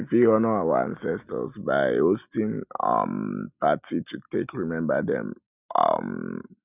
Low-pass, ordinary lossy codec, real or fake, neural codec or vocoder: 3.6 kHz; none; real; none